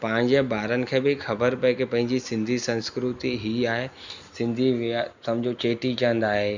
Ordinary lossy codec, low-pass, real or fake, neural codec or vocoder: Opus, 64 kbps; 7.2 kHz; real; none